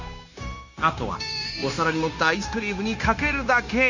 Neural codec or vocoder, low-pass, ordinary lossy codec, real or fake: codec, 16 kHz, 0.9 kbps, LongCat-Audio-Codec; 7.2 kHz; none; fake